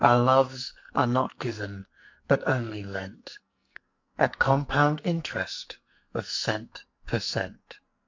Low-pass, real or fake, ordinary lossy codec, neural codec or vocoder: 7.2 kHz; fake; MP3, 64 kbps; codec, 44.1 kHz, 2.6 kbps, SNAC